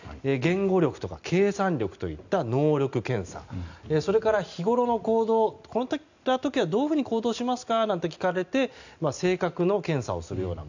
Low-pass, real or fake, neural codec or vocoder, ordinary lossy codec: 7.2 kHz; real; none; none